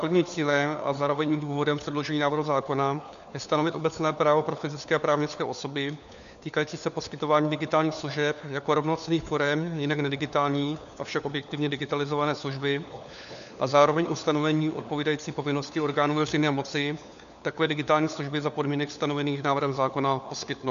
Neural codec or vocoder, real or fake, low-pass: codec, 16 kHz, 4 kbps, FunCodec, trained on LibriTTS, 50 frames a second; fake; 7.2 kHz